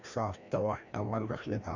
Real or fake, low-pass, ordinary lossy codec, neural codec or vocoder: fake; 7.2 kHz; none; codec, 16 kHz, 1 kbps, FreqCodec, larger model